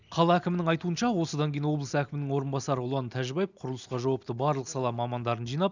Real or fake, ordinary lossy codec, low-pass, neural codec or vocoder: real; none; 7.2 kHz; none